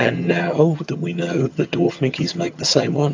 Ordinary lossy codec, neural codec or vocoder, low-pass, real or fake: AAC, 48 kbps; vocoder, 22.05 kHz, 80 mel bands, HiFi-GAN; 7.2 kHz; fake